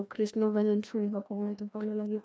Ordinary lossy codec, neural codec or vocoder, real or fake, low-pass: none; codec, 16 kHz, 1 kbps, FreqCodec, larger model; fake; none